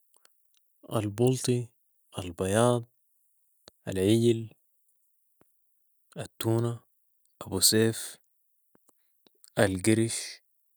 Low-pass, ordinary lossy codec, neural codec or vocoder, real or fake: none; none; none; real